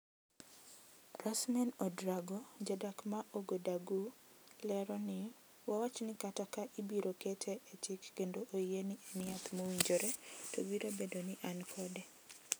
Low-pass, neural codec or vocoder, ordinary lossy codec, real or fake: none; none; none; real